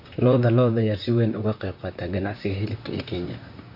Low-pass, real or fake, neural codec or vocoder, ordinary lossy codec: 5.4 kHz; fake; vocoder, 44.1 kHz, 128 mel bands, Pupu-Vocoder; none